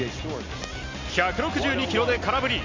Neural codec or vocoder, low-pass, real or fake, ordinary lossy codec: none; 7.2 kHz; real; MP3, 64 kbps